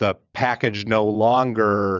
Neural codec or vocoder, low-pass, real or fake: vocoder, 22.05 kHz, 80 mel bands, WaveNeXt; 7.2 kHz; fake